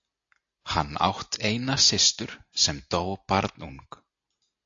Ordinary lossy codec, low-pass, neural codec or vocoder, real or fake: AAC, 48 kbps; 7.2 kHz; none; real